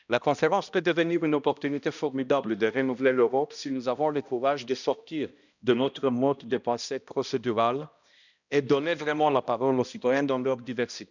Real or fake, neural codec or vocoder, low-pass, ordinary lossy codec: fake; codec, 16 kHz, 1 kbps, X-Codec, HuBERT features, trained on balanced general audio; 7.2 kHz; none